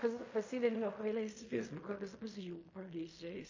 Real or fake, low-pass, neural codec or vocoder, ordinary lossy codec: fake; 7.2 kHz; codec, 16 kHz in and 24 kHz out, 0.4 kbps, LongCat-Audio-Codec, fine tuned four codebook decoder; MP3, 32 kbps